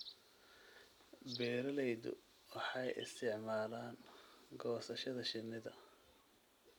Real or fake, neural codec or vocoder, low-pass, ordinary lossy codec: real; none; none; none